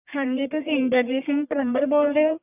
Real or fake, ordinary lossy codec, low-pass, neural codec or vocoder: fake; none; 3.6 kHz; codec, 44.1 kHz, 1.7 kbps, Pupu-Codec